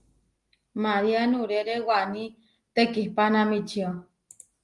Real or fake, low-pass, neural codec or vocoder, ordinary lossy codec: real; 10.8 kHz; none; Opus, 24 kbps